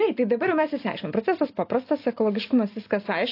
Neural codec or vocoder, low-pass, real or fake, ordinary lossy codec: none; 5.4 kHz; real; AAC, 32 kbps